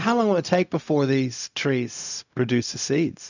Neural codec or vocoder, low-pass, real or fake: codec, 16 kHz, 0.4 kbps, LongCat-Audio-Codec; 7.2 kHz; fake